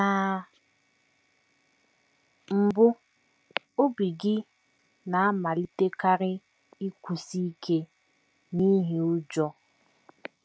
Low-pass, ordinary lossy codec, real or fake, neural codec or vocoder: none; none; real; none